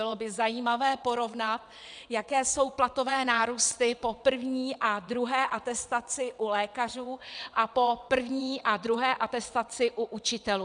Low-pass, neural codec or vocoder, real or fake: 9.9 kHz; vocoder, 22.05 kHz, 80 mel bands, WaveNeXt; fake